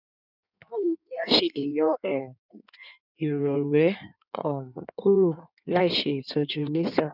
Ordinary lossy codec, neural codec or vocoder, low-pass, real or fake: none; codec, 16 kHz in and 24 kHz out, 1.1 kbps, FireRedTTS-2 codec; 5.4 kHz; fake